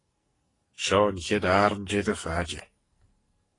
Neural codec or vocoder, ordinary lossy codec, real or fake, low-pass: codec, 44.1 kHz, 2.6 kbps, SNAC; AAC, 32 kbps; fake; 10.8 kHz